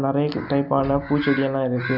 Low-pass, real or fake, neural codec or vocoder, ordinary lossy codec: 5.4 kHz; real; none; none